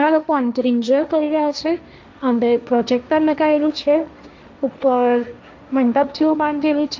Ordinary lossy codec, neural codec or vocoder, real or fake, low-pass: none; codec, 16 kHz, 1.1 kbps, Voila-Tokenizer; fake; none